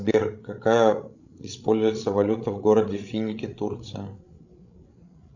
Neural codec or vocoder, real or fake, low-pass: codec, 16 kHz, 16 kbps, FreqCodec, larger model; fake; 7.2 kHz